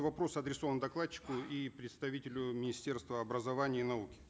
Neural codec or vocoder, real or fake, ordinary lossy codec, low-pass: none; real; none; none